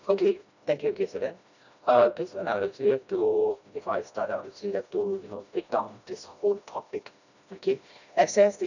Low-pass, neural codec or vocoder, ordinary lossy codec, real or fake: 7.2 kHz; codec, 16 kHz, 1 kbps, FreqCodec, smaller model; none; fake